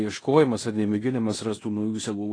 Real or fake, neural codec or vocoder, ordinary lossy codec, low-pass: fake; codec, 16 kHz in and 24 kHz out, 0.9 kbps, LongCat-Audio-Codec, fine tuned four codebook decoder; AAC, 32 kbps; 9.9 kHz